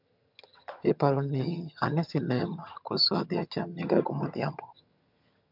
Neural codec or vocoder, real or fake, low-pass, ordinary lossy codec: vocoder, 22.05 kHz, 80 mel bands, HiFi-GAN; fake; 5.4 kHz; MP3, 48 kbps